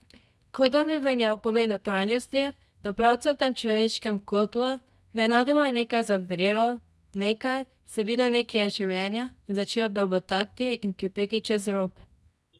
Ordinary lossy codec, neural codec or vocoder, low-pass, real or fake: none; codec, 24 kHz, 0.9 kbps, WavTokenizer, medium music audio release; none; fake